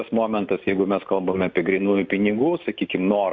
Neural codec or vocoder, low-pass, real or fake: none; 7.2 kHz; real